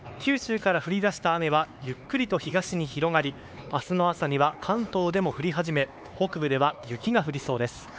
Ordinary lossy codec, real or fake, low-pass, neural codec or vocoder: none; fake; none; codec, 16 kHz, 4 kbps, X-Codec, HuBERT features, trained on LibriSpeech